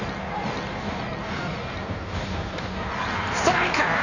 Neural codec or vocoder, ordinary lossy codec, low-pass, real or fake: codec, 16 kHz in and 24 kHz out, 1.1 kbps, FireRedTTS-2 codec; none; 7.2 kHz; fake